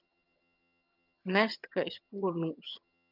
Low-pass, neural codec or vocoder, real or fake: 5.4 kHz; vocoder, 22.05 kHz, 80 mel bands, HiFi-GAN; fake